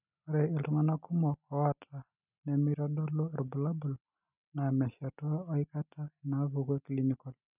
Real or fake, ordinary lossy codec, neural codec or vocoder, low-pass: real; none; none; 3.6 kHz